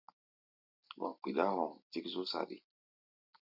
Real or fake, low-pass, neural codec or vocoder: real; 5.4 kHz; none